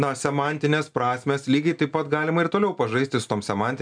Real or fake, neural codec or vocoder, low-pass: real; none; 9.9 kHz